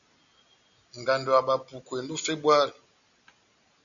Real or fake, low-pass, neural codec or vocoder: real; 7.2 kHz; none